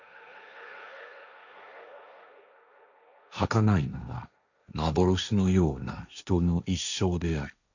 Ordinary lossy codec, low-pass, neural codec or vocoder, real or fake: none; 7.2 kHz; codec, 16 kHz, 1.1 kbps, Voila-Tokenizer; fake